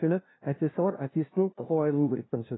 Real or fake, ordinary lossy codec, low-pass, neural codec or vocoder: fake; AAC, 16 kbps; 7.2 kHz; codec, 16 kHz, 0.5 kbps, FunCodec, trained on LibriTTS, 25 frames a second